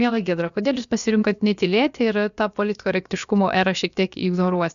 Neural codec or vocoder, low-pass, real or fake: codec, 16 kHz, about 1 kbps, DyCAST, with the encoder's durations; 7.2 kHz; fake